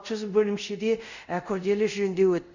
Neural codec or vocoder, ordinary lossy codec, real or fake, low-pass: codec, 24 kHz, 0.5 kbps, DualCodec; none; fake; 7.2 kHz